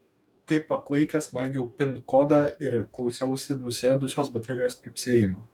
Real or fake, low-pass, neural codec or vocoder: fake; 19.8 kHz; codec, 44.1 kHz, 2.6 kbps, DAC